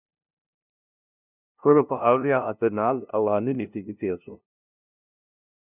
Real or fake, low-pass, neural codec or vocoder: fake; 3.6 kHz; codec, 16 kHz, 0.5 kbps, FunCodec, trained on LibriTTS, 25 frames a second